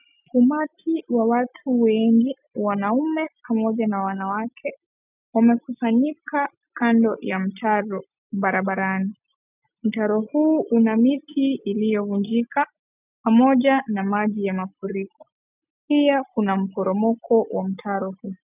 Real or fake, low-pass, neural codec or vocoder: real; 3.6 kHz; none